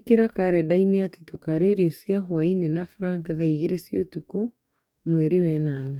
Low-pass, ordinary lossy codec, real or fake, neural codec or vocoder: 19.8 kHz; none; fake; codec, 44.1 kHz, 2.6 kbps, DAC